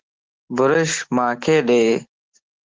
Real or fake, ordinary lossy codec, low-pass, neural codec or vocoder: real; Opus, 16 kbps; 7.2 kHz; none